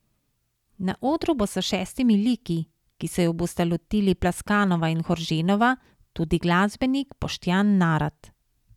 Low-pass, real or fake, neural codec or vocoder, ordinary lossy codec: 19.8 kHz; real; none; none